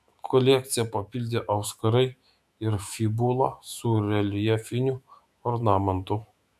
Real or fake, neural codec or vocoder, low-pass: fake; autoencoder, 48 kHz, 128 numbers a frame, DAC-VAE, trained on Japanese speech; 14.4 kHz